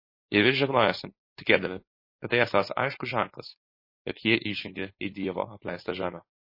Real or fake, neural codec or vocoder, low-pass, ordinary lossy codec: fake; codec, 16 kHz, 4.8 kbps, FACodec; 5.4 kHz; MP3, 24 kbps